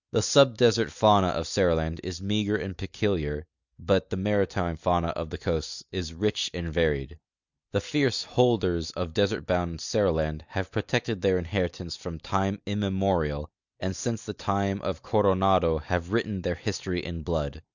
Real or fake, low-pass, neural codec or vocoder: real; 7.2 kHz; none